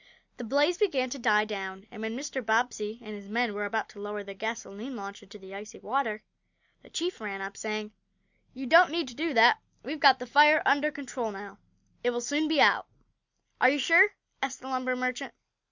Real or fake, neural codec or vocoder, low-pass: real; none; 7.2 kHz